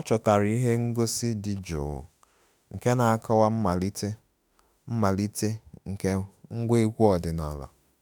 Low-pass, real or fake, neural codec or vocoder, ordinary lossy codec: none; fake; autoencoder, 48 kHz, 32 numbers a frame, DAC-VAE, trained on Japanese speech; none